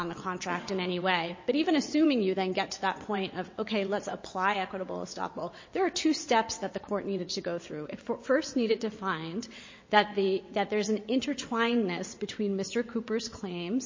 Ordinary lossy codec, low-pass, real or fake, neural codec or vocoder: MP3, 32 kbps; 7.2 kHz; fake; vocoder, 22.05 kHz, 80 mel bands, WaveNeXt